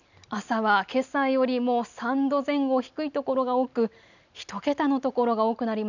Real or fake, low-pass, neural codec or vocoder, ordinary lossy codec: real; 7.2 kHz; none; none